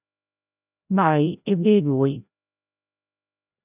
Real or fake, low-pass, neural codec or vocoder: fake; 3.6 kHz; codec, 16 kHz, 0.5 kbps, FreqCodec, larger model